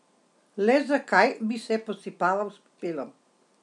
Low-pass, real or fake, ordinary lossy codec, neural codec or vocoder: 10.8 kHz; real; none; none